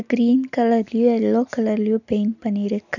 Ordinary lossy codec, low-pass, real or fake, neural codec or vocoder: none; 7.2 kHz; real; none